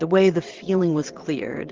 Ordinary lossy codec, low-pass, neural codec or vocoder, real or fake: Opus, 16 kbps; 7.2 kHz; vocoder, 22.05 kHz, 80 mel bands, WaveNeXt; fake